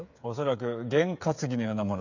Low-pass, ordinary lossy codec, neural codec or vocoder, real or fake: 7.2 kHz; none; codec, 16 kHz, 8 kbps, FreqCodec, smaller model; fake